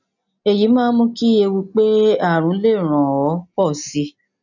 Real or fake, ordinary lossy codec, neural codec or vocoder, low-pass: real; none; none; 7.2 kHz